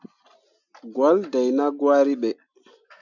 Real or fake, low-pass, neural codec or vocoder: real; 7.2 kHz; none